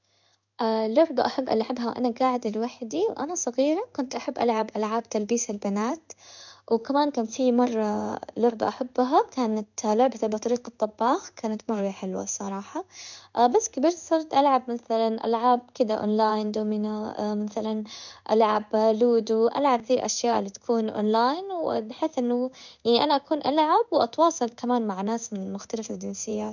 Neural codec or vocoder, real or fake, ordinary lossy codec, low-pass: codec, 16 kHz in and 24 kHz out, 1 kbps, XY-Tokenizer; fake; none; 7.2 kHz